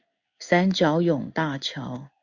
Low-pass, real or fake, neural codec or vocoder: 7.2 kHz; fake; codec, 16 kHz in and 24 kHz out, 1 kbps, XY-Tokenizer